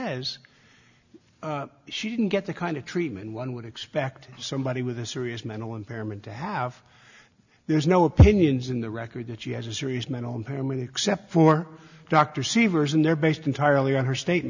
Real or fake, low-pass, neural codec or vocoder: real; 7.2 kHz; none